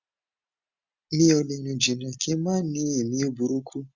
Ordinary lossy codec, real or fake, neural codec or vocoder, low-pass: Opus, 64 kbps; real; none; 7.2 kHz